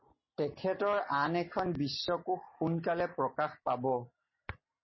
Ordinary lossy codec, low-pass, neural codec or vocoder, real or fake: MP3, 24 kbps; 7.2 kHz; none; real